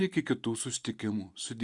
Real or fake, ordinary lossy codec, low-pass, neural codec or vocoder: real; Opus, 64 kbps; 10.8 kHz; none